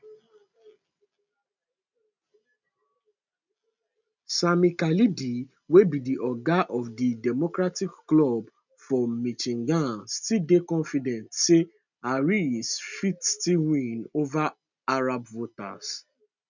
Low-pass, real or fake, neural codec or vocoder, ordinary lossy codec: 7.2 kHz; real; none; none